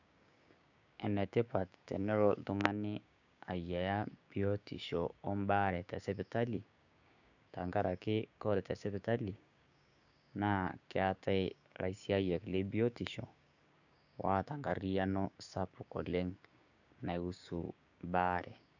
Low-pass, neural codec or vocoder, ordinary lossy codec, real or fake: 7.2 kHz; codec, 16 kHz, 6 kbps, DAC; none; fake